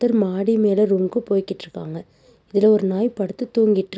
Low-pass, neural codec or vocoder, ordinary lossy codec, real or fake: none; none; none; real